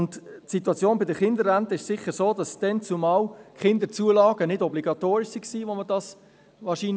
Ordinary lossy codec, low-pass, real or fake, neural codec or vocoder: none; none; real; none